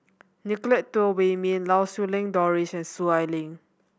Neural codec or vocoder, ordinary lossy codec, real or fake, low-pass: none; none; real; none